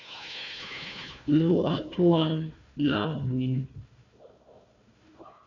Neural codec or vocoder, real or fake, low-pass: codec, 16 kHz, 1 kbps, FunCodec, trained on Chinese and English, 50 frames a second; fake; 7.2 kHz